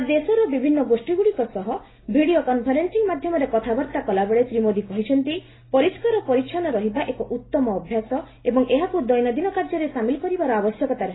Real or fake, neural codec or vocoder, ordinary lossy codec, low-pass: real; none; AAC, 16 kbps; 7.2 kHz